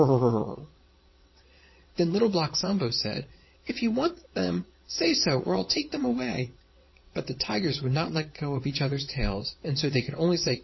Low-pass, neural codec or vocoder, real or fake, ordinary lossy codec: 7.2 kHz; vocoder, 22.05 kHz, 80 mel bands, WaveNeXt; fake; MP3, 24 kbps